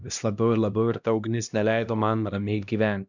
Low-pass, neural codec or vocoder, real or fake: 7.2 kHz; codec, 16 kHz, 1 kbps, X-Codec, HuBERT features, trained on LibriSpeech; fake